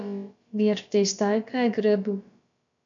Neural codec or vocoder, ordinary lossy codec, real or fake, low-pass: codec, 16 kHz, about 1 kbps, DyCAST, with the encoder's durations; MP3, 96 kbps; fake; 7.2 kHz